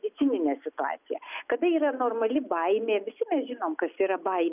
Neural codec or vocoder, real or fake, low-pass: none; real; 3.6 kHz